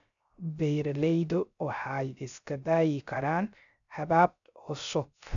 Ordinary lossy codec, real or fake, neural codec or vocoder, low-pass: none; fake; codec, 16 kHz, 0.3 kbps, FocalCodec; 7.2 kHz